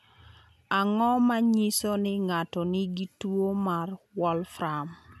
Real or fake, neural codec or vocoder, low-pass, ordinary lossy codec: real; none; 14.4 kHz; none